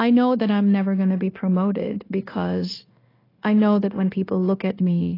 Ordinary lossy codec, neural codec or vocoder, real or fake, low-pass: AAC, 24 kbps; codec, 16 kHz, 0.9 kbps, LongCat-Audio-Codec; fake; 5.4 kHz